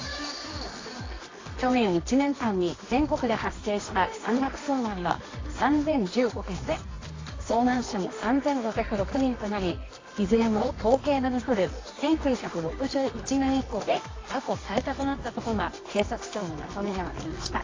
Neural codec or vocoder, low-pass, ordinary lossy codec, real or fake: codec, 24 kHz, 0.9 kbps, WavTokenizer, medium music audio release; 7.2 kHz; AAC, 32 kbps; fake